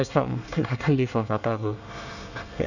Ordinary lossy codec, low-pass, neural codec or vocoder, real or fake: none; 7.2 kHz; codec, 24 kHz, 1 kbps, SNAC; fake